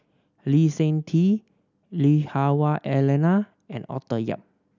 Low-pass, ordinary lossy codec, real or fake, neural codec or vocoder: 7.2 kHz; none; real; none